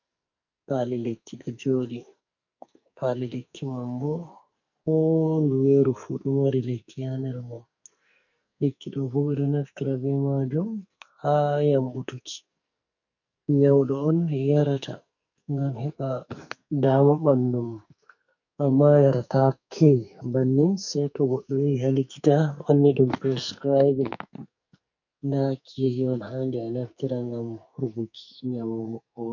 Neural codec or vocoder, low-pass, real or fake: codec, 44.1 kHz, 2.6 kbps, SNAC; 7.2 kHz; fake